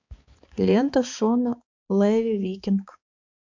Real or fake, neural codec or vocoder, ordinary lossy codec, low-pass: fake; codec, 16 kHz, 4 kbps, X-Codec, HuBERT features, trained on balanced general audio; MP3, 64 kbps; 7.2 kHz